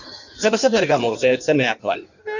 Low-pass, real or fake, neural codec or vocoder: 7.2 kHz; fake; codec, 16 kHz in and 24 kHz out, 1.1 kbps, FireRedTTS-2 codec